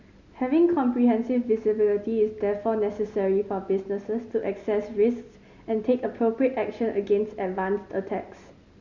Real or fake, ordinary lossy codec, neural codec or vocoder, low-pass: real; Opus, 64 kbps; none; 7.2 kHz